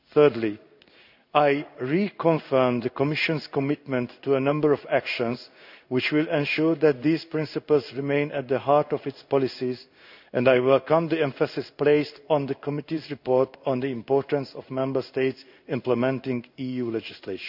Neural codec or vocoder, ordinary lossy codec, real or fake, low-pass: codec, 16 kHz in and 24 kHz out, 1 kbps, XY-Tokenizer; none; fake; 5.4 kHz